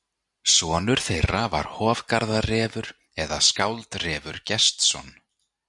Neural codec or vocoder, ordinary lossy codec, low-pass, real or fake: none; MP3, 96 kbps; 10.8 kHz; real